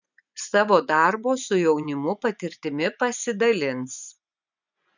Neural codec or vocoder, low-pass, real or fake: vocoder, 24 kHz, 100 mel bands, Vocos; 7.2 kHz; fake